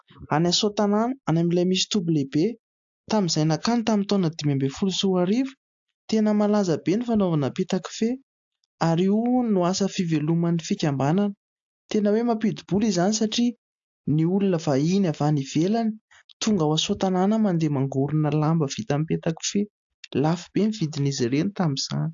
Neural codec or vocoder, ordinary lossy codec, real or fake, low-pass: none; AAC, 64 kbps; real; 7.2 kHz